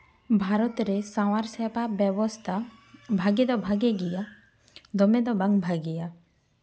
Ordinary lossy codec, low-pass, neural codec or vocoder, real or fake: none; none; none; real